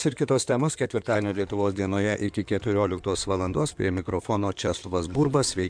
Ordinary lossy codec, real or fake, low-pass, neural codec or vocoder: MP3, 96 kbps; fake; 9.9 kHz; codec, 16 kHz in and 24 kHz out, 2.2 kbps, FireRedTTS-2 codec